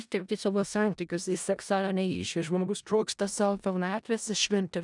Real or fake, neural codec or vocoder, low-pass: fake; codec, 16 kHz in and 24 kHz out, 0.4 kbps, LongCat-Audio-Codec, four codebook decoder; 10.8 kHz